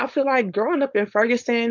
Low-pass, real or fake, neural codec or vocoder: 7.2 kHz; fake; codec, 16 kHz, 4.8 kbps, FACodec